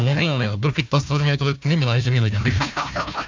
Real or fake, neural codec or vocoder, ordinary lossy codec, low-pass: fake; codec, 16 kHz, 1 kbps, FunCodec, trained on Chinese and English, 50 frames a second; MP3, 64 kbps; 7.2 kHz